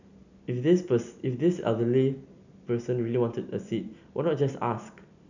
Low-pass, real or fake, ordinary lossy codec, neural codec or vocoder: 7.2 kHz; real; none; none